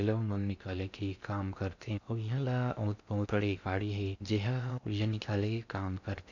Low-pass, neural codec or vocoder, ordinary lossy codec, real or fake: 7.2 kHz; codec, 16 kHz in and 24 kHz out, 0.6 kbps, FocalCodec, streaming, 2048 codes; none; fake